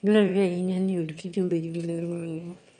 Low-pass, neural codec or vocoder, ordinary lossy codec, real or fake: 9.9 kHz; autoencoder, 22.05 kHz, a latent of 192 numbers a frame, VITS, trained on one speaker; none; fake